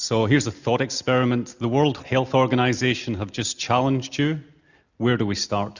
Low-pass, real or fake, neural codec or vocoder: 7.2 kHz; real; none